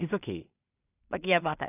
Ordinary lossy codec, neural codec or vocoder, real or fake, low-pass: none; codec, 16 kHz in and 24 kHz out, 0.4 kbps, LongCat-Audio-Codec, two codebook decoder; fake; 3.6 kHz